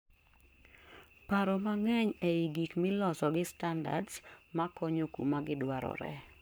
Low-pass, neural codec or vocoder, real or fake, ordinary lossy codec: none; codec, 44.1 kHz, 7.8 kbps, Pupu-Codec; fake; none